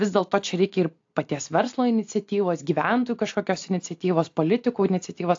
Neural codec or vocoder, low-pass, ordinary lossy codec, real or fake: none; 7.2 kHz; AAC, 48 kbps; real